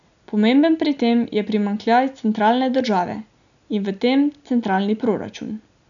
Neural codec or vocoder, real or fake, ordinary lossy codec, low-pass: none; real; none; 7.2 kHz